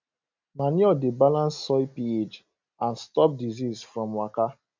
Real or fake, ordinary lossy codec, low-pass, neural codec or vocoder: real; MP3, 64 kbps; 7.2 kHz; none